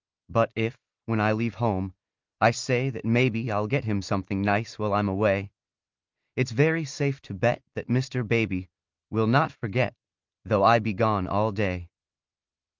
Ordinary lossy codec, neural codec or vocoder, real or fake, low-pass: Opus, 32 kbps; none; real; 7.2 kHz